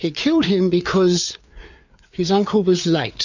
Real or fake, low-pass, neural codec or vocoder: fake; 7.2 kHz; codec, 16 kHz, 4 kbps, FreqCodec, larger model